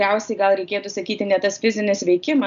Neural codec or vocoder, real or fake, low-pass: none; real; 7.2 kHz